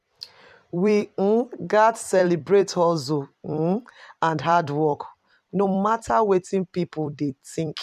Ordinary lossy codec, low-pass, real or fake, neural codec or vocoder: none; 14.4 kHz; fake; vocoder, 44.1 kHz, 128 mel bands every 256 samples, BigVGAN v2